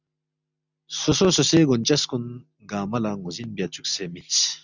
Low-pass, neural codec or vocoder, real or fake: 7.2 kHz; none; real